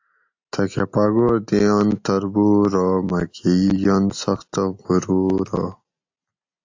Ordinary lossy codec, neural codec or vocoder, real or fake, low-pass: AAC, 48 kbps; none; real; 7.2 kHz